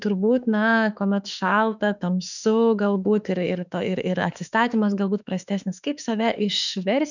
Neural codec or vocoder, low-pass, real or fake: autoencoder, 48 kHz, 32 numbers a frame, DAC-VAE, trained on Japanese speech; 7.2 kHz; fake